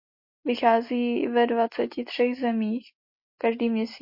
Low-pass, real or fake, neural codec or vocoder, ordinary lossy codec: 5.4 kHz; real; none; MP3, 32 kbps